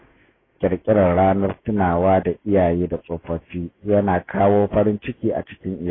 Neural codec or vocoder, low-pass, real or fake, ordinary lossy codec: autoencoder, 48 kHz, 128 numbers a frame, DAC-VAE, trained on Japanese speech; 7.2 kHz; fake; AAC, 16 kbps